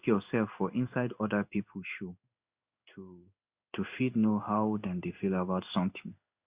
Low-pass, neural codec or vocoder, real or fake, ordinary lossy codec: 3.6 kHz; codec, 16 kHz in and 24 kHz out, 1 kbps, XY-Tokenizer; fake; Opus, 64 kbps